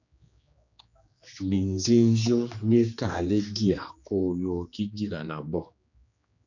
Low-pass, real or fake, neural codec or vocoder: 7.2 kHz; fake; codec, 16 kHz, 2 kbps, X-Codec, HuBERT features, trained on general audio